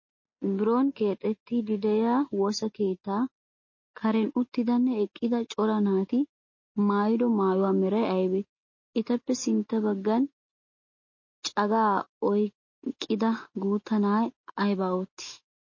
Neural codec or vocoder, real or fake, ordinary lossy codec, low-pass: none; real; MP3, 32 kbps; 7.2 kHz